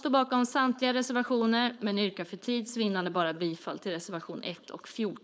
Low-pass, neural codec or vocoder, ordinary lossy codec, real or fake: none; codec, 16 kHz, 4.8 kbps, FACodec; none; fake